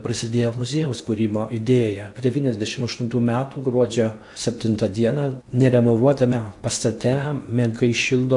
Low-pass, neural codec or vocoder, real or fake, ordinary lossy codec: 10.8 kHz; codec, 16 kHz in and 24 kHz out, 0.8 kbps, FocalCodec, streaming, 65536 codes; fake; AAC, 64 kbps